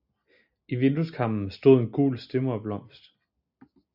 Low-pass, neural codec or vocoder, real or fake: 5.4 kHz; none; real